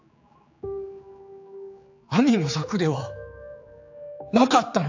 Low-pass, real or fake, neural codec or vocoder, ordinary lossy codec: 7.2 kHz; fake; codec, 16 kHz, 4 kbps, X-Codec, HuBERT features, trained on general audio; AAC, 48 kbps